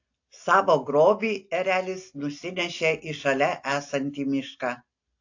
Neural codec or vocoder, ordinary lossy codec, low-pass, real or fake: none; AAC, 48 kbps; 7.2 kHz; real